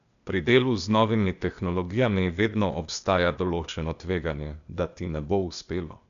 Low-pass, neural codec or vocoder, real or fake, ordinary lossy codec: 7.2 kHz; codec, 16 kHz, 0.8 kbps, ZipCodec; fake; none